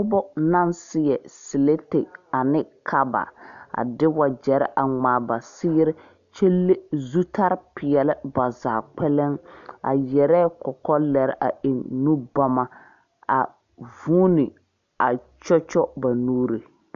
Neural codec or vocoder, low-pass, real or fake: none; 7.2 kHz; real